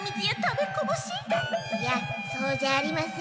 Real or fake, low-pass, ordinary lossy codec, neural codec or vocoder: real; none; none; none